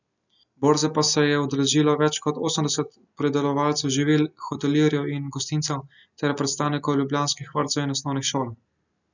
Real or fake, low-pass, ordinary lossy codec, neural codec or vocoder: real; 7.2 kHz; none; none